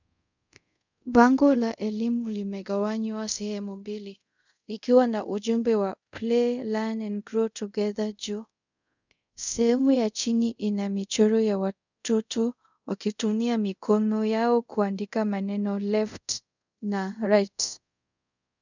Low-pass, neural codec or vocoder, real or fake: 7.2 kHz; codec, 24 kHz, 0.5 kbps, DualCodec; fake